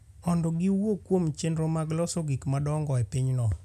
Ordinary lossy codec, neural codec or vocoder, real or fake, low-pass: none; none; real; 14.4 kHz